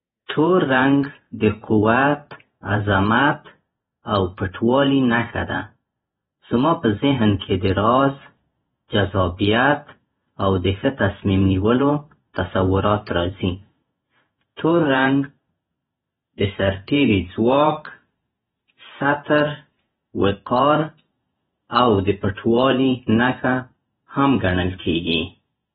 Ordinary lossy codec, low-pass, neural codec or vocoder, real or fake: AAC, 16 kbps; 19.8 kHz; vocoder, 48 kHz, 128 mel bands, Vocos; fake